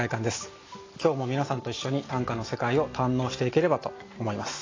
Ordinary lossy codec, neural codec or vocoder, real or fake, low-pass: AAC, 32 kbps; none; real; 7.2 kHz